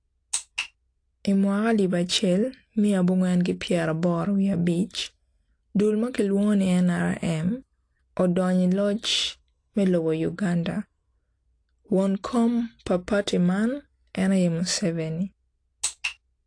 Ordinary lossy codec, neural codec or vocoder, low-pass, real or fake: AAC, 48 kbps; none; 9.9 kHz; real